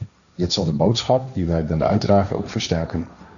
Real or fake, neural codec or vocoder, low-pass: fake; codec, 16 kHz, 1.1 kbps, Voila-Tokenizer; 7.2 kHz